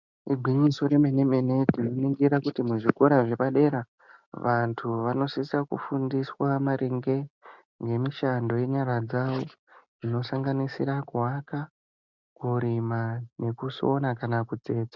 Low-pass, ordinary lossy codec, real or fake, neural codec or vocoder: 7.2 kHz; MP3, 64 kbps; real; none